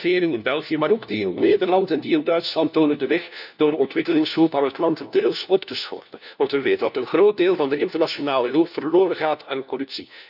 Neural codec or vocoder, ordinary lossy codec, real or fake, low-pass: codec, 16 kHz, 1 kbps, FunCodec, trained on LibriTTS, 50 frames a second; none; fake; 5.4 kHz